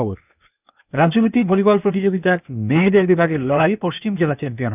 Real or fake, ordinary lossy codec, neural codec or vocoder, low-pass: fake; none; codec, 16 kHz, 0.8 kbps, ZipCodec; 3.6 kHz